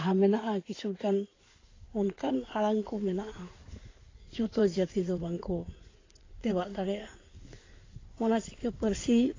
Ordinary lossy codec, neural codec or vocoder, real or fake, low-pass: AAC, 32 kbps; codec, 16 kHz in and 24 kHz out, 2.2 kbps, FireRedTTS-2 codec; fake; 7.2 kHz